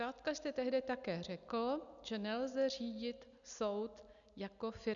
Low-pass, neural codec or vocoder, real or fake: 7.2 kHz; none; real